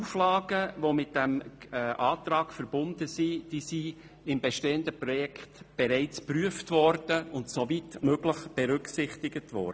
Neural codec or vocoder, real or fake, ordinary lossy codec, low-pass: none; real; none; none